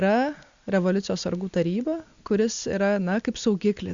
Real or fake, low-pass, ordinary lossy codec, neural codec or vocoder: real; 7.2 kHz; Opus, 64 kbps; none